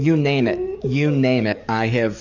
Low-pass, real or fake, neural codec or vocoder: 7.2 kHz; fake; codec, 44.1 kHz, 7.8 kbps, DAC